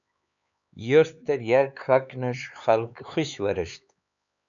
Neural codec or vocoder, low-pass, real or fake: codec, 16 kHz, 4 kbps, X-Codec, HuBERT features, trained on LibriSpeech; 7.2 kHz; fake